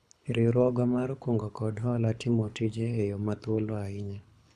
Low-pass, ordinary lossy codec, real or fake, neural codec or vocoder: none; none; fake; codec, 24 kHz, 6 kbps, HILCodec